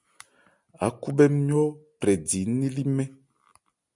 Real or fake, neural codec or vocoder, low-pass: real; none; 10.8 kHz